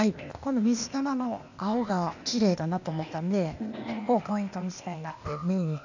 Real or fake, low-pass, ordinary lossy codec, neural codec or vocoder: fake; 7.2 kHz; none; codec, 16 kHz, 0.8 kbps, ZipCodec